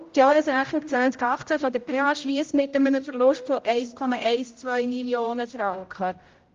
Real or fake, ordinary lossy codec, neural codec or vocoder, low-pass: fake; Opus, 32 kbps; codec, 16 kHz, 0.5 kbps, X-Codec, HuBERT features, trained on general audio; 7.2 kHz